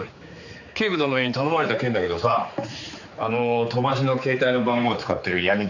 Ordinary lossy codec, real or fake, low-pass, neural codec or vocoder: none; fake; 7.2 kHz; codec, 16 kHz, 4 kbps, X-Codec, HuBERT features, trained on general audio